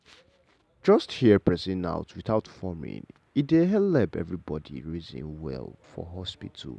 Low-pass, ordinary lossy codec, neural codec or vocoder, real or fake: none; none; none; real